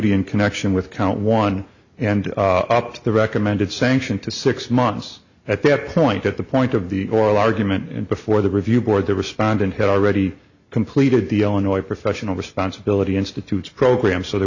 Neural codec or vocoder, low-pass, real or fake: none; 7.2 kHz; real